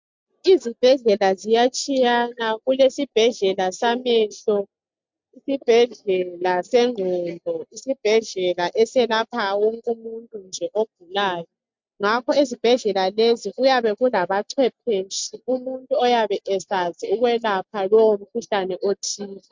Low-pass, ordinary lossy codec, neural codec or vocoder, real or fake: 7.2 kHz; MP3, 64 kbps; none; real